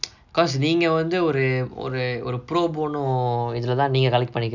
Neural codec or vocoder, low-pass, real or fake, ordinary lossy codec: none; 7.2 kHz; real; none